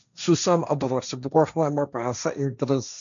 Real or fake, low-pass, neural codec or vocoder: fake; 7.2 kHz; codec, 16 kHz, 1.1 kbps, Voila-Tokenizer